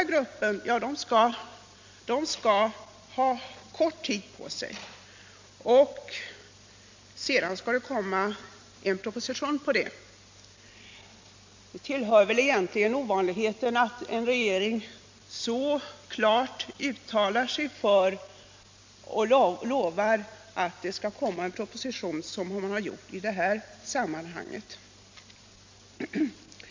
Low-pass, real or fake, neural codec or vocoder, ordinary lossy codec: 7.2 kHz; real; none; MP3, 48 kbps